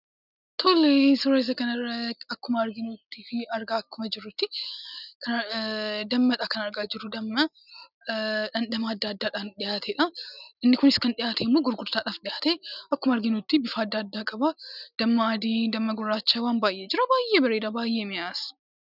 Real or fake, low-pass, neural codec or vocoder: real; 5.4 kHz; none